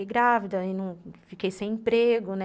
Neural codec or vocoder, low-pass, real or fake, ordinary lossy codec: none; none; real; none